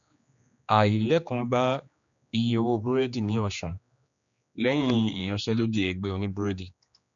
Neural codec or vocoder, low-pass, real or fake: codec, 16 kHz, 2 kbps, X-Codec, HuBERT features, trained on general audio; 7.2 kHz; fake